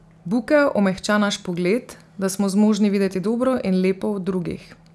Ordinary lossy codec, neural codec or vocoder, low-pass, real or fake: none; none; none; real